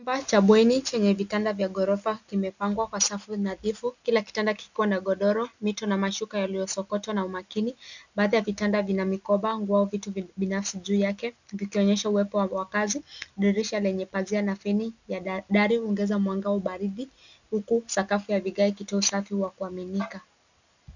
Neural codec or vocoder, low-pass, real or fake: none; 7.2 kHz; real